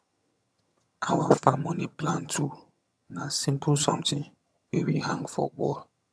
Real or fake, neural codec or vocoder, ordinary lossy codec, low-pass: fake; vocoder, 22.05 kHz, 80 mel bands, HiFi-GAN; none; none